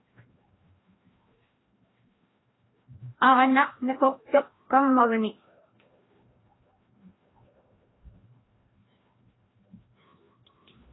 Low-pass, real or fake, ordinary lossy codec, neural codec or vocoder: 7.2 kHz; fake; AAC, 16 kbps; codec, 16 kHz, 1 kbps, FreqCodec, larger model